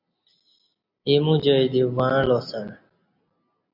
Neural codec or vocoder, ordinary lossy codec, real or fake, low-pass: none; MP3, 48 kbps; real; 5.4 kHz